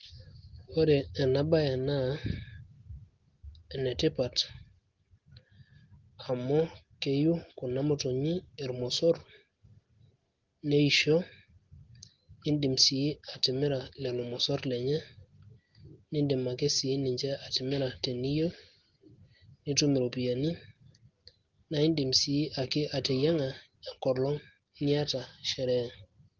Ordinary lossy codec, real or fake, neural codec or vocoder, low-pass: Opus, 16 kbps; real; none; 7.2 kHz